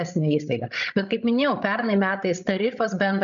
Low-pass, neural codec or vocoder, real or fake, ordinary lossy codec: 7.2 kHz; codec, 16 kHz, 16 kbps, FreqCodec, larger model; fake; MP3, 64 kbps